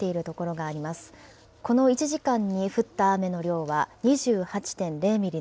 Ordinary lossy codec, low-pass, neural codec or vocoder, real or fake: none; none; none; real